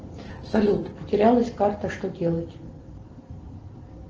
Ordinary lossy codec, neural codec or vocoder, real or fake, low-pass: Opus, 16 kbps; none; real; 7.2 kHz